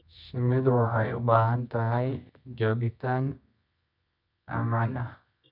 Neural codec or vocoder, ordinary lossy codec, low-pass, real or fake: codec, 24 kHz, 0.9 kbps, WavTokenizer, medium music audio release; none; 5.4 kHz; fake